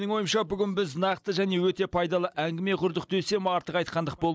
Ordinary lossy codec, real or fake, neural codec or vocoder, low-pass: none; real; none; none